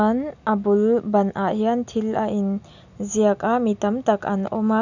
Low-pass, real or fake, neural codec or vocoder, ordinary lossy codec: 7.2 kHz; real; none; none